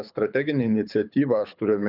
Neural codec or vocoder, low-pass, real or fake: codec, 24 kHz, 6 kbps, HILCodec; 5.4 kHz; fake